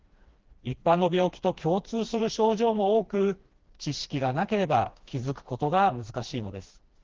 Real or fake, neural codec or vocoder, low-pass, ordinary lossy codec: fake; codec, 16 kHz, 2 kbps, FreqCodec, smaller model; 7.2 kHz; Opus, 16 kbps